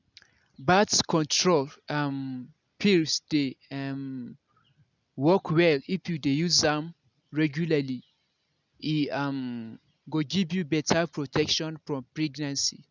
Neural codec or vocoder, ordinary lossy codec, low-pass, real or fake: none; none; 7.2 kHz; real